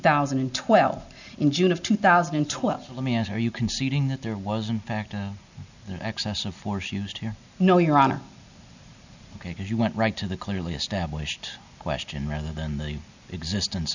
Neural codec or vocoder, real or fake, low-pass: none; real; 7.2 kHz